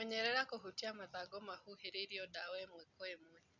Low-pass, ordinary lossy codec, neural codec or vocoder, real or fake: 7.2 kHz; none; none; real